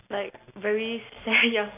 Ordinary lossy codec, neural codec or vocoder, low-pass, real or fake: AAC, 32 kbps; none; 3.6 kHz; real